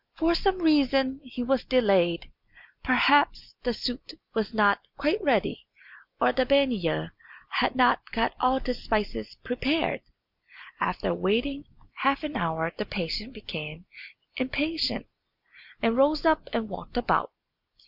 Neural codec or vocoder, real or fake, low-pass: none; real; 5.4 kHz